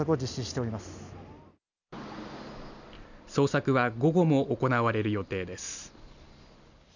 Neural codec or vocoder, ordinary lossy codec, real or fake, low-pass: none; none; real; 7.2 kHz